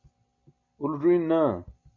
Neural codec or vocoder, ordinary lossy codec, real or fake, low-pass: none; MP3, 64 kbps; real; 7.2 kHz